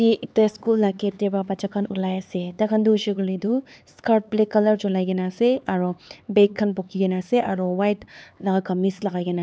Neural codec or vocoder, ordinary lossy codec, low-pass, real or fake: codec, 16 kHz, 4 kbps, X-Codec, HuBERT features, trained on LibriSpeech; none; none; fake